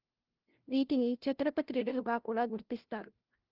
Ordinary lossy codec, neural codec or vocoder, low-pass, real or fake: Opus, 16 kbps; codec, 16 kHz, 0.5 kbps, FunCodec, trained on LibriTTS, 25 frames a second; 5.4 kHz; fake